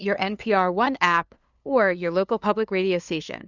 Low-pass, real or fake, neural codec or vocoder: 7.2 kHz; fake; codec, 16 kHz, 2 kbps, FunCodec, trained on LibriTTS, 25 frames a second